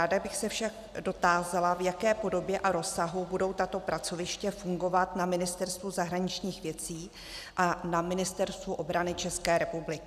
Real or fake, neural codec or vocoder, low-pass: real; none; 14.4 kHz